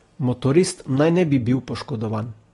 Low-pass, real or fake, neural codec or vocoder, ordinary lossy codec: 10.8 kHz; real; none; AAC, 32 kbps